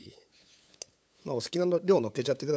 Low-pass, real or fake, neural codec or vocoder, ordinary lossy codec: none; fake; codec, 16 kHz, 8 kbps, FunCodec, trained on LibriTTS, 25 frames a second; none